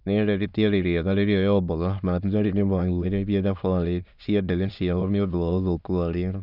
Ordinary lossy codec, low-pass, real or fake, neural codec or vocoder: Opus, 64 kbps; 5.4 kHz; fake; autoencoder, 22.05 kHz, a latent of 192 numbers a frame, VITS, trained on many speakers